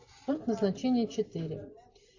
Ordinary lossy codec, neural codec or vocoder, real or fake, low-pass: AAC, 48 kbps; none; real; 7.2 kHz